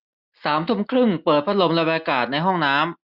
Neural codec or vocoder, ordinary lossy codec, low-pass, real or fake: none; none; 5.4 kHz; real